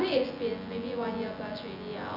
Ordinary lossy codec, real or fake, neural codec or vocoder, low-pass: none; fake; vocoder, 24 kHz, 100 mel bands, Vocos; 5.4 kHz